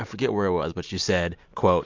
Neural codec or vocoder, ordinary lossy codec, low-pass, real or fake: none; MP3, 64 kbps; 7.2 kHz; real